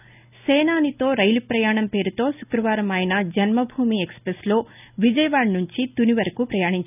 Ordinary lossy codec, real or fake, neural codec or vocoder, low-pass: none; real; none; 3.6 kHz